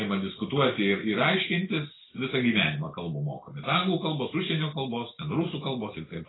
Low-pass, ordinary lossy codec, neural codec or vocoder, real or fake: 7.2 kHz; AAC, 16 kbps; none; real